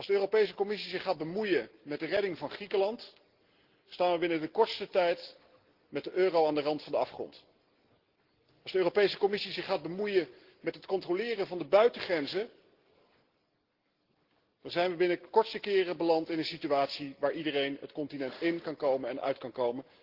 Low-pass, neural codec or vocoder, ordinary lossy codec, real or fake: 5.4 kHz; none; Opus, 16 kbps; real